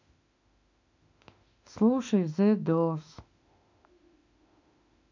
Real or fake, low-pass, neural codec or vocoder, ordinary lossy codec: fake; 7.2 kHz; autoencoder, 48 kHz, 32 numbers a frame, DAC-VAE, trained on Japanese speech; none